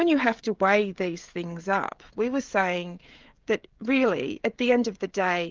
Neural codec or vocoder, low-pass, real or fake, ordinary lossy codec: codec, 16 kHz, 16 kbps, FreqCodec, smaller model; 7.2 kHz; fake; Opus, 32 kbps